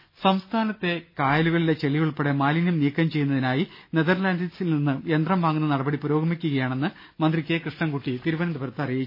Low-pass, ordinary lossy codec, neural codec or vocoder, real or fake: 5.4 kHz; MP3, 24 kbps; none; real